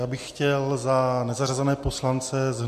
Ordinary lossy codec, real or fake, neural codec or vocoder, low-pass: MP3, 64 kbps; real; none; 14.4 kHz